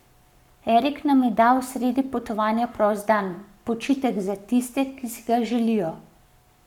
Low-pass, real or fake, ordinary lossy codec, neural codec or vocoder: 19.8 kHz; fake; none; codec, 44.1 kHz, 7.8 kbps, Pupu-Codec